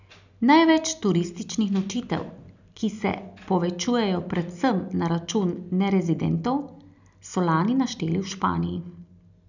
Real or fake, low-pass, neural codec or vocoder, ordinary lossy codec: real; 7.2 kHz; none; none